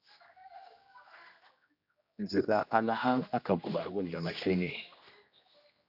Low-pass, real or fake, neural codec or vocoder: 5.4 kHz; fake; codec, 16 kHz, 1 kbps, X-Codec, HuBERT features, trained on general audio